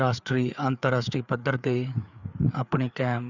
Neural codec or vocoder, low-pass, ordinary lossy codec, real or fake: codec, 16 kHz, 4 kbps, FreqCodec, larger model; 7.2 kHz; none; fake